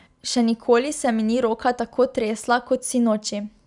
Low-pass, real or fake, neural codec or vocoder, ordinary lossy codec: 10.8 kHz; real; none; none